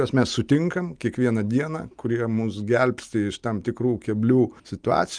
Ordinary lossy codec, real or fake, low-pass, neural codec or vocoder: Opus, 32 kbps; real; 9.9 kHz; none